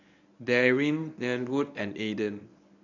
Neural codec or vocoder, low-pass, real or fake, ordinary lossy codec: codec, 24 kHz, 0.9 kbps, WavTokenizer, medium speech release version 1; 7.2 kHz; fake; none